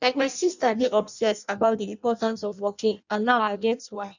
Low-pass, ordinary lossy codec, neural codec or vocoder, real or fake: 7.2 kHz; none; codec, 16 kHz in and 24 kHz out, 0.6 kbps, FireRedTTS-2 codec; fake